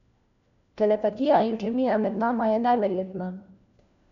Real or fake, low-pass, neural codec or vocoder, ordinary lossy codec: fake; 7.2 kHz; codec, 16 kHz, 1 kbps, FunCodec, trained on LibriTTS, 50 frames a second; MP3, 96 kbps